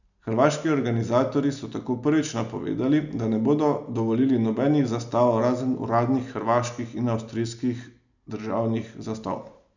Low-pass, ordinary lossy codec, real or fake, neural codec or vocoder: 7.2 kHz; none; real; none